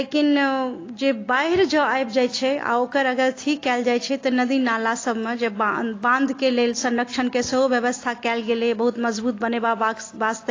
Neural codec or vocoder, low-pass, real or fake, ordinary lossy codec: none; 7.2 kHz; real; AAC, 32 kbps